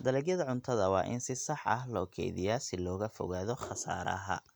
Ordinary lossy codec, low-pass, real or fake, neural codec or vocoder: none; none; real; none